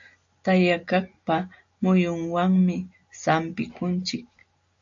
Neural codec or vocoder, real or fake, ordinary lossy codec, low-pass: none; real; AAC, 64 kbps; 7.2 kHz